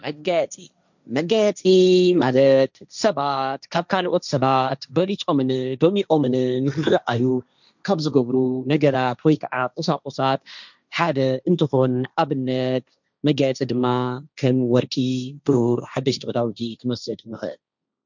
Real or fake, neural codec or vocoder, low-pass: fake; codec, 16 kHz, 1.1 kbps, Voila-Tokenizer; 7.2 kHz